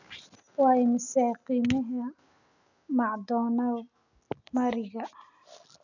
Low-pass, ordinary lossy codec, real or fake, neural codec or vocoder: 7.2 kHz; none; real; none